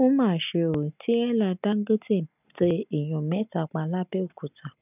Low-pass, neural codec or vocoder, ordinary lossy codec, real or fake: 3.6 kHz; none; none; real